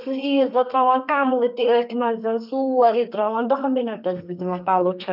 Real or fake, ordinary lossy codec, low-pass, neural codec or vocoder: fake; none; 5.4 kHz; codec, 32 kHz, 1.9 kbps, SNAC